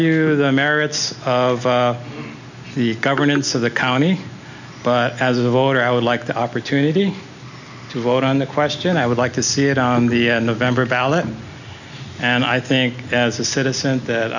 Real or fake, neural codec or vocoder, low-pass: real; none; 7.2 kHz